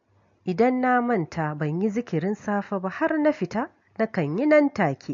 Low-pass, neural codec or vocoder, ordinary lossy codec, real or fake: 7.2 kHz; none; MP3, 48 kbps; real